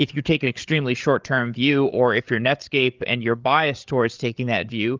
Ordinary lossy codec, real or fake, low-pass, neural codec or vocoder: Opus, 32 kbps; fake; 7.2 kHz; codec, 16 kHz, 8 kbps, FreqCodec, larger model